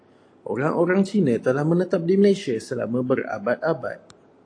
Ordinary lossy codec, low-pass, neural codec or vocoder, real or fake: AAC, 48 kbps; 9.9 kHz; none; real